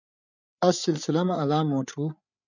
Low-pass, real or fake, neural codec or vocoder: 7.2 kHz; fake; codec, 16 kHz, 8 kbps, FreqCodec, larger model